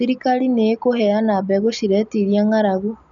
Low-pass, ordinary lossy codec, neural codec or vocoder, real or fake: 7.2 kHz; none; none; real